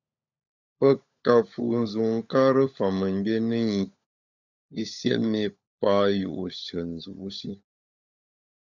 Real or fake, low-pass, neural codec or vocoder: fake; 7.2 kHz; codec, 16 kHz, 16 kbps, FunCodec, trained on LibriTTS, 50 frames a second